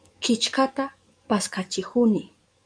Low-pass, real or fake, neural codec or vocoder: 9.9 kHz; fake; codec, 44.1 kHz, 7.8 kbps, DAC